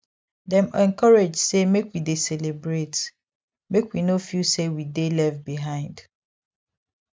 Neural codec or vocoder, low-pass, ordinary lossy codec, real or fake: none; none; none; real